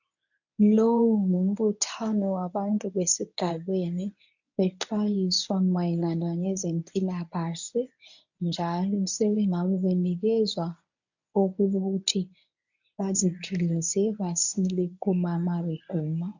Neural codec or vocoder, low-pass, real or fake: codec, 24 kHz, 0.9 kbps, WavTokenizer, medium speech release version 1; 7.2 kHz; fake